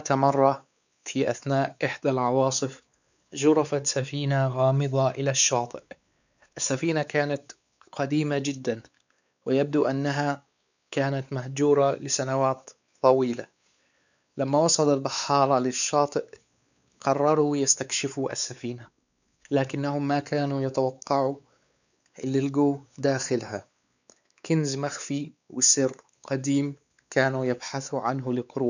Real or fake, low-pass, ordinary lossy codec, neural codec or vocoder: fake; 7.2 kHz; none; codec, 16 kHz, 4 kbps, X-Codec, WavLM features, trained on Multilingual LibriSpeech